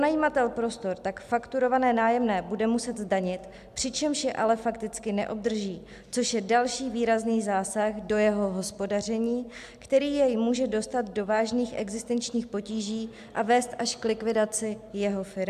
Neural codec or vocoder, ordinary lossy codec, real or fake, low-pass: none; AAC, 96 kbps; real; 14.4 kHz